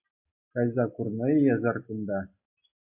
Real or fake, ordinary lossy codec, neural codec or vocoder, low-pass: real; MP3, 32 kbps; none; 3.6 kHz